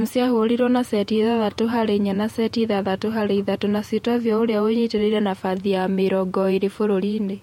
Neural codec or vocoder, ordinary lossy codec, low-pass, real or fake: vocoder, 48 kHz, 128 mel bands, Vocos; MP3, 64 kbps; 19.8 kHz; fake